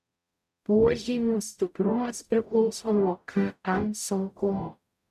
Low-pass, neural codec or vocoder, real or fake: 14.4 kHz; codec, 44.1 kHz, 0.9 kbps, DAC; fake